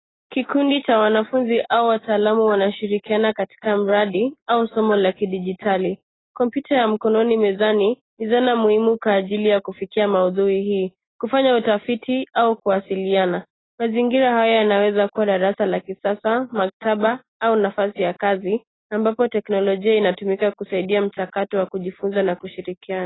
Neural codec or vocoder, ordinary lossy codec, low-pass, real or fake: none; AAC, 16 kbps; 7.2 kHz; real